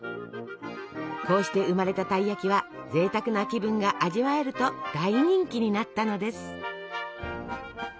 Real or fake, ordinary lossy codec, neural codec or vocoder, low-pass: real; none; none; none